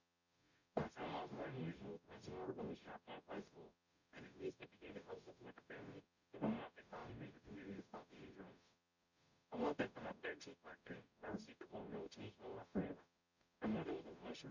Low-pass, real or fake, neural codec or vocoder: 7.2 kHz; fake; codec, 44.1 kHz, 0.9 kbps, DAC